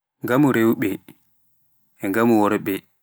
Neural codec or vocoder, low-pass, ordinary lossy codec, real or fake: none; none; none; real